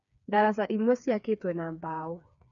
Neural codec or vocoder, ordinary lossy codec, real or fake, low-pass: codec, 16 kHz, 4 kbps, FreqCodec, smaller model; none; fake; 7.2 kHz